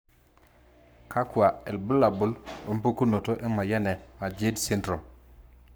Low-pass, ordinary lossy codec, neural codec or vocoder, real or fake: none; none; codec, 44.1 kHz, 7.8 kbps, Pupu-Codec; fake